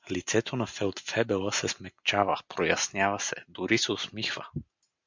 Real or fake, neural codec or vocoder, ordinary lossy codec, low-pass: real; none; MP3, 64 kbps; 7.2 kHz